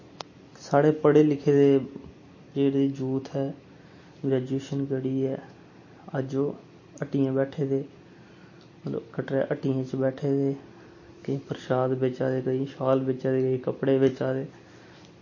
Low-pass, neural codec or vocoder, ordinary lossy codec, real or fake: 7.2 kHz; none; MP3, 32 kbps; real